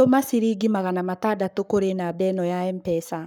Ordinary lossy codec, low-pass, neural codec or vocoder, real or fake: none; 19.8 kHz; codec, 44.1 kHz, 7.8 kbps, Pupu-Codec; fake